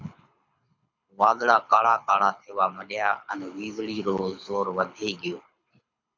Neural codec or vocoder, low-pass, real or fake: codec, 24 kHz, 6 kbps, HILCodec; 7.2 kHz; fake